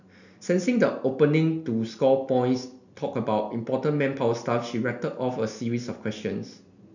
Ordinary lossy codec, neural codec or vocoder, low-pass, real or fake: none; none; 7.2 kHz; real